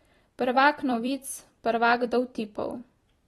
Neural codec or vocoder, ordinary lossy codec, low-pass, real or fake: vocoder, 44.1 kHz, 128 mel bands every 256 samples, BigVGAN v2; AAC, 32 kbps; 19.8 kHz; fake